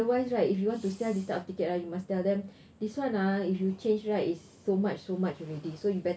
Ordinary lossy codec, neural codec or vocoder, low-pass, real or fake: none; none; none; real